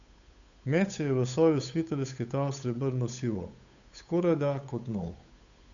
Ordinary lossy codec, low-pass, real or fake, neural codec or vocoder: none; 7.2 kHz; fake; codec, 16 kHz, 8 kbps, FunCodec, trained on Chinese and English, 25 frames a second